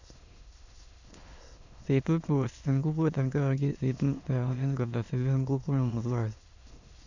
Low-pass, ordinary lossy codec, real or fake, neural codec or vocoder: 7.2 kHz; none; fake; autoencoder, 22.05 kHz, a latent of 192 numbers a frame, VITS, trained on many speakers